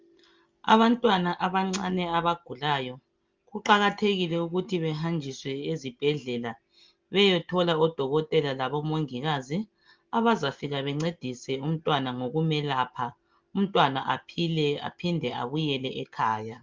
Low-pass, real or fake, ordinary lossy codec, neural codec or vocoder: 7.2 kHz; real; Opus, 32 kbps; none